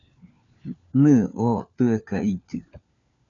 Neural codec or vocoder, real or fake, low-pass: codec, 16 kHz, 4 kbps, FunCodec, trained on LibriTTS, 50 frames a second; fake; 7.2 kHz